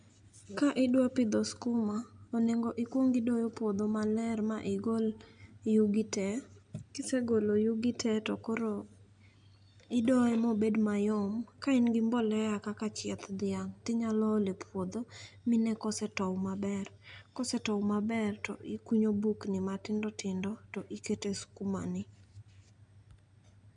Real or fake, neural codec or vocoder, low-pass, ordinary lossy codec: real; none; 9.9 kHz; none